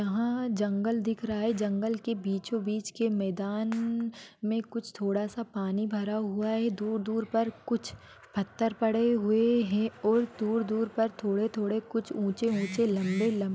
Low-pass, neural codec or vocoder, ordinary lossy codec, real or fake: none; none; none; real